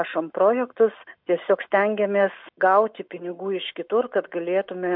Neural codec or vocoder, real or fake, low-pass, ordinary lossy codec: none; real; 5.4 kHz; MP3, 48 kbps